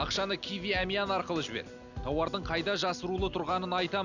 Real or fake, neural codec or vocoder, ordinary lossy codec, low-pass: real; none; none; 7.2 kHz